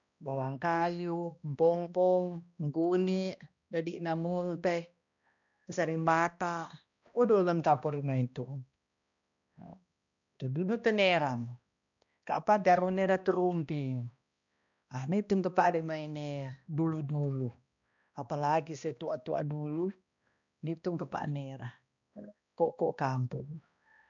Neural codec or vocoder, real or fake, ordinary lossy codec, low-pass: codec, 16 kHz, 1 kbps, X-Codec, HuBERT features, trained on balanced general audio; fake; none; 7.2 kHz